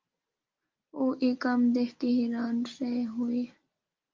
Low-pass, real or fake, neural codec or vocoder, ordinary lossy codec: 7.2 kHz; real; none; Opus, 24 kbps